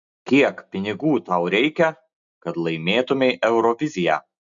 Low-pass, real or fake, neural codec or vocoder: 7.2 kHz; real; none